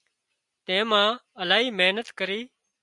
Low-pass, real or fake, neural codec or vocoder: 10.8 kHz; real; none